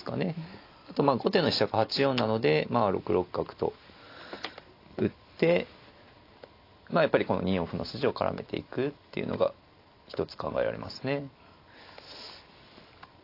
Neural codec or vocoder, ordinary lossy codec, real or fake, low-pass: none; AAC, 32 kbps; real; 5.4 kHz